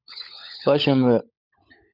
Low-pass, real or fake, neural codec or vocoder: 5.4 kHz; fake; codec, 16 kHz, 16 kbps, FunCodec, trained on LibriTTS, 50 frames a second